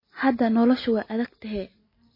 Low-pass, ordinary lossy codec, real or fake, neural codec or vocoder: 5.4 kHz; MP3, 24 kbps; real; none